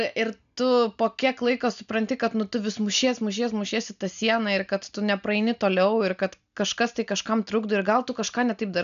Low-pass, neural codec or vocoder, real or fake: 7.2 kHz; none; real